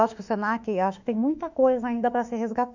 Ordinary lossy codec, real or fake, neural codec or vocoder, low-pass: none; fake; autoencoder, 48 kHz, 32 numbers a frame, DAC-VAE, trained on Japanese speech; 7.2 kHz